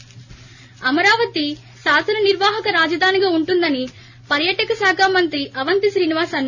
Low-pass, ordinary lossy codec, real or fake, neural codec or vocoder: 7.2 kHz; none; real; none